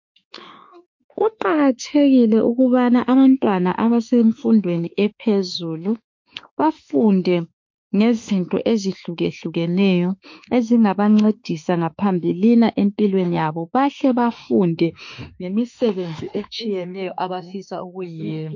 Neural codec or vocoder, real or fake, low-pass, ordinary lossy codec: autoencoder, 48 kHz, 32 numbers a frame, DAC-VAE, trained on Japanese speech; fake; 7.2 kHz; MP3, 48 kbps